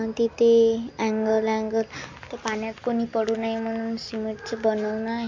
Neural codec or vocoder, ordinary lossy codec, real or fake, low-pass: none; MP3, 48 kbps; real; 7.2 kHz